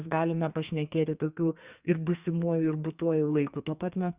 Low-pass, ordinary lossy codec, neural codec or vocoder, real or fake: 3.6 kHz; Opus, 64 kbps; codec, 44.1 kHz, 2.6 kbps, SNAC; fake